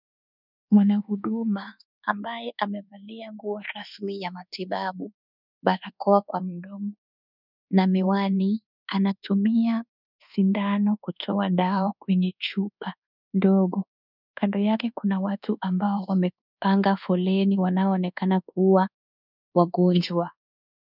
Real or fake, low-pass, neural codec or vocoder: fake; 5.4 kHz; codec, 24 kHz, 1.2 kbps, DualCodec